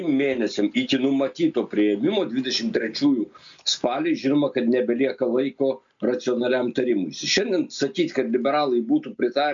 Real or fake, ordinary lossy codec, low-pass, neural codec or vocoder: real; MP3, 64 kbps; 7.2 kHz; none